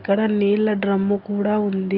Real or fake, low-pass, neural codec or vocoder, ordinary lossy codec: real; 5.4 kHz; none; Opus, 32 kbps